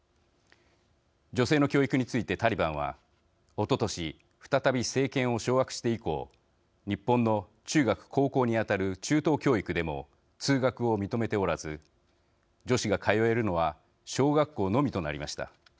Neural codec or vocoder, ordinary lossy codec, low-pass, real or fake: none; none; none; real